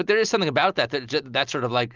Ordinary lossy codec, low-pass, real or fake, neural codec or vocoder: Opus, 24 kbps; 7.2 kHz; real; none